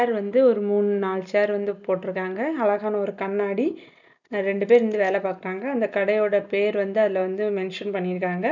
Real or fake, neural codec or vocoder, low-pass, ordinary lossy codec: real; none; 7.2 kHz; none